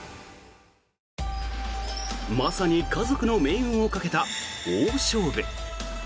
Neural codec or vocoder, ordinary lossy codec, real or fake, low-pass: none; none; real; none